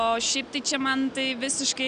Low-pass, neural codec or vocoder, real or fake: 9.9 kHz; none; real